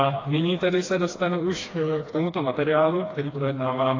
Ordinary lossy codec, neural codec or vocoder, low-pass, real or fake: AAC, 32 kbps; codec, 16 kHz, 2 kbps, FreqCodec, smaller model; 7.2 kHz; fake